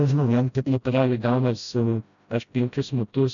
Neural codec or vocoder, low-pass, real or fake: codec, 16 kHz, 0.5 kbps, FreqCodec, smaller model; 7.2 kHz; fake